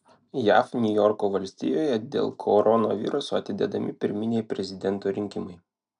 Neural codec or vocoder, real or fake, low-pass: none; real; 9.9 kHz